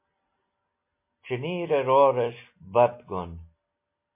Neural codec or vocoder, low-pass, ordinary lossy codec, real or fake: none; 3.6 kHz; MP3, 24 kbps; real